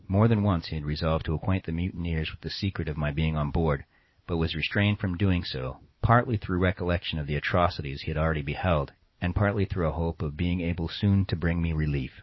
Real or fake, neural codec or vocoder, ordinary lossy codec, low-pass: fake; vocoder, 44.1 kHz, 128 mel bands every 256 samples, BigVGAN v2; MP3, 24 kbps; 7.2 kHz